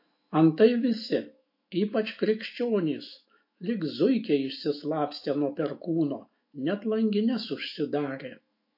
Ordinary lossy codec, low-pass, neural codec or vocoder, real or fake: MP3, 32 kbps; 5.4 kHz; autoencoder, 48 kHz, 128 numbers a frame, DAC-VAE, trained on Japanese speech; fake